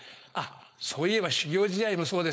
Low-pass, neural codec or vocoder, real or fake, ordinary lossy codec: none; codec, 16 kHz, 4.8 kbps, FACodec; fake; none